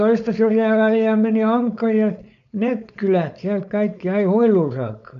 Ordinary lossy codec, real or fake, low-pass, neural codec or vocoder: none; fake; 7.2 kHz; codec, 16 kHz, 4.8 kbps, FACodec